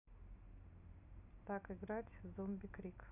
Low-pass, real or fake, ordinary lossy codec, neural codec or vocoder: 3.6 kHz; real; none; none